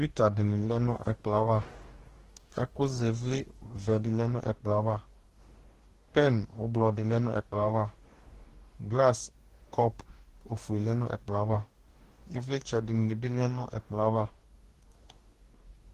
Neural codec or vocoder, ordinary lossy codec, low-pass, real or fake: codec, 44.1 kHz, 2.6 kbps, DAC; Opus, 16 kbps; 14.4 kHz; fake